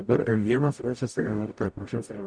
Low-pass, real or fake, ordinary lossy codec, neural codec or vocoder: 9.9 kHz; fake; MP3, 96 kbps; codec, 44.1 kHz, 0.9 kbps, DAC